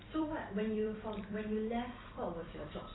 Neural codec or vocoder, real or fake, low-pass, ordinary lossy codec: vocoder, 44.1 kHz, 128 mel bands every 256 samples, BigVGAN v2; fake; 7.2 kHz; AAC, 16 kbps